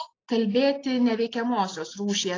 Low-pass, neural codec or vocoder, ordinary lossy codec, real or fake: 7.2 kHz; none; AAC, 32 kbps; real